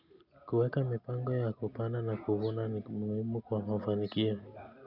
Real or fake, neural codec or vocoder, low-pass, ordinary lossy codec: real; none; 5.4 kHz; none